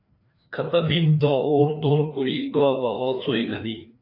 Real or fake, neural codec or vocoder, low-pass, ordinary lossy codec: fake; codec, 16 kHz, 2 kbps, FreqCodec, larger model; 5.4 kHz; AAC, 32 kbps